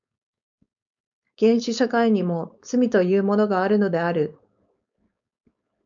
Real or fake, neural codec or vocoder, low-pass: fake; codec, 16 kHz, 4.8 kbps, FACodec; 7.2 kHz